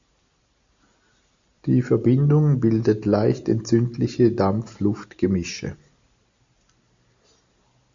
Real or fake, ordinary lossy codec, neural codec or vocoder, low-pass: real; AAC, 64 kbps; none; 7.2 kHz